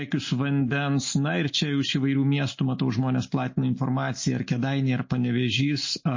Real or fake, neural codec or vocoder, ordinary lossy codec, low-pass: real; none; MP3, 32 kbps; 7.2 kHz